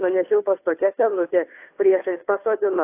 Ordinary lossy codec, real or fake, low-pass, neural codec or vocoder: AAC, 24 kbps; fake; 3.6 kHz; codec, 16 kHz, 2 kbps, FunCodec, trained on Chinese and English, 25 frames a second